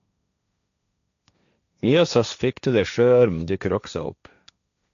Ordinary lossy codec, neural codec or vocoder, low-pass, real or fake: none; codec, 16 kHz, 1.1 kbps, Voila-Tokenizer; 7.2 kHz; fake